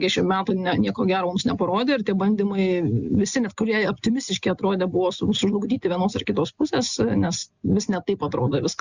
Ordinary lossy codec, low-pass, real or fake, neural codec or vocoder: Opus, 64 kbps; 7.2 kHz; real; none